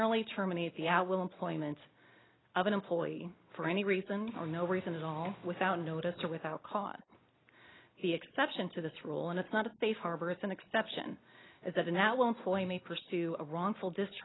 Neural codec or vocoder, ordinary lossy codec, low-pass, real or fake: none; AAC, 16 kbps; 7.2 kHz; real